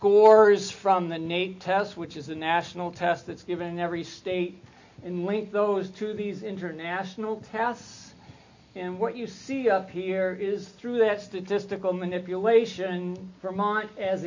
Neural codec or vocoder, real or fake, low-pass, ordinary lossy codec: none; real; 7.2 kHz; AAC, 48 kbps